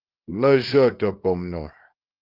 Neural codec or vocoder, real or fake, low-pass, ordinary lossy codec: codec, 24 kHz, 0.9 kbps, WavTokenizer, small release; fake; 5.4 kHz; Opus, 32 kbps